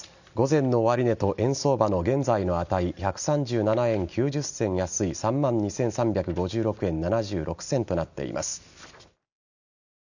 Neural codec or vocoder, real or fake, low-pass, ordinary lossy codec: none; real; 7.2 kHz; none